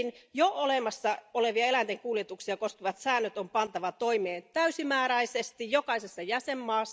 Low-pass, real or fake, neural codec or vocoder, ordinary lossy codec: none; real; none; none